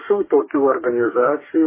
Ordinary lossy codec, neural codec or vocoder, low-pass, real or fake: MP3, 16 kbps; codec, 44.1 kHz, 2.6 kbps, DAC; 3.6 kHz; fake